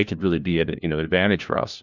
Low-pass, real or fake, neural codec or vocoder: 7.2 kHz; fake; codec, 16 kHz, 1 kbps, FunCodec, trained on LibriTTS, 50 frames a second